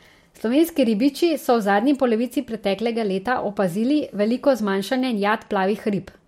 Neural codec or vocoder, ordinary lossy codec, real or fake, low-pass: none; MP3, 64 kbps; real; 19.8 kHz